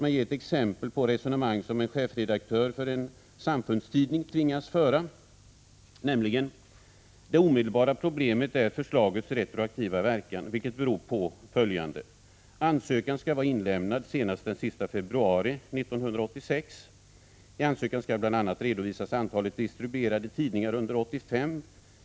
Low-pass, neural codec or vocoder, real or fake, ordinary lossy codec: none; none; real; none